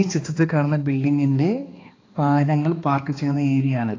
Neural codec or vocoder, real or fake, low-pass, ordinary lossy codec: codec, 16 kHz, 2 kbps, X-Codec, HuBERT features, trained on balanced general audio; fake; 7.2 kHz; AAC, 32 kbps